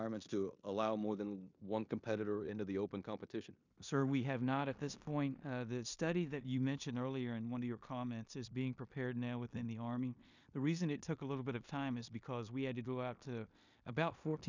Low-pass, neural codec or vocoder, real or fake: 7.2 kHz; codec, 16 kHz in and 24 kHz out, 0.9 kbps, LongCat-Audio-Codec, four codebook decoder; fake